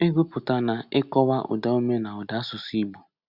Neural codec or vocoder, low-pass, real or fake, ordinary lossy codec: none; 5.4 kHz; real; Opus, 64 kbps